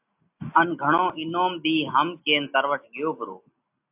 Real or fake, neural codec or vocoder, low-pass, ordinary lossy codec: real; none; 3.6 kHz; AAC, 32 kbps